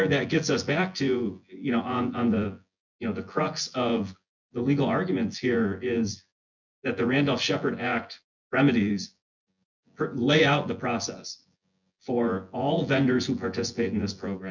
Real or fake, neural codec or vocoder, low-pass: fake; vocoder, 24 kHz, 100 mel bands, Vocos; 7.2 kHz